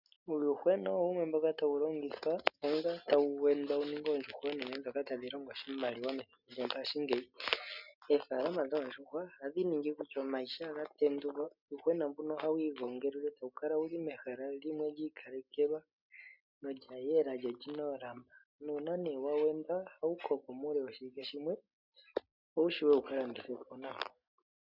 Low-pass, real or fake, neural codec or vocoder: 5.4 kHz; real; none